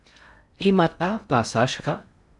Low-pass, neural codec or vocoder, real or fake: 10.8 kHz; codec, 16 kHz in and 24 kHz out, 0.6 kbps, FocalCodec, streaming, 2048 codes; fake